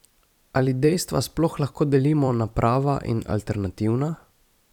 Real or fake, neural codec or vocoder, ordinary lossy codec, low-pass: fake; vocoder, 44.1 kHz, 128 mel bands every 256 samples, BigVGAN v2; none; 19.8 kHz